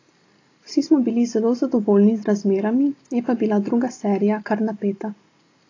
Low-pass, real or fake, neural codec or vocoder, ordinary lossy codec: 7.2 kHz; real; none; AAC, 32 kbps